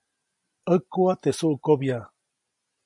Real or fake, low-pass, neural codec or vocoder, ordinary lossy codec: real; 10.8 kHz; none; MP3, 64 kbps